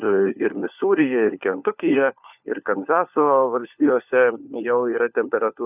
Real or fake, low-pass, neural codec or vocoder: fake; 3.6 kHz; codec, 16 kHz, 4 kbps, FunCodec, trained on LibriTTS, 50 frames a second